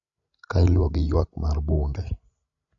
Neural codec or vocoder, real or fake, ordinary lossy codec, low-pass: codec, 16 kHz, 16 kbps, FreqCodec, larger model; fake; none; 7.2 kHz